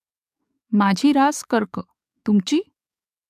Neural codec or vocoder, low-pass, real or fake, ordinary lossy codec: codec, 44.1 kHz, 7.8 kbps, DAC; 14.4 kHz; fake; none